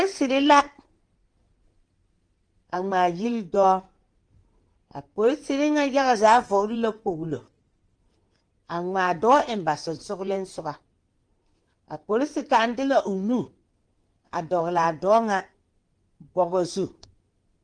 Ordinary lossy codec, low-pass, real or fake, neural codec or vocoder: Opus, 24 kbps; 9.9 kHz; fake; codec, 16 kHz in and 24 kHz out, 2.2 kbps, FireRedTTS-2 codec